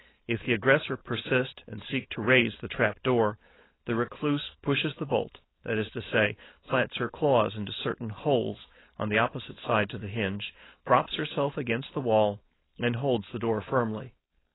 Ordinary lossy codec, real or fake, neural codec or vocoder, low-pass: AAC, 16 kbps; fake; codec, 16 kHz, 4.8 kbps, FACodec; 7.2 kHz